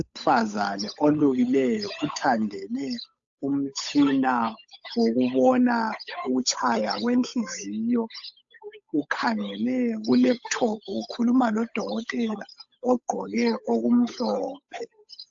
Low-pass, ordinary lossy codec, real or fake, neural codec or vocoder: 7.2 kHz; AAC, 64 kbps; fake; codec, 16 kHz, 8 kbps, FunCodec, trained on Chinese and English, 25 frames a second